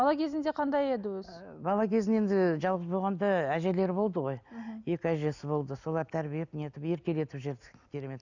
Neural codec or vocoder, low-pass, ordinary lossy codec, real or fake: none; 7.2 kHz; none; real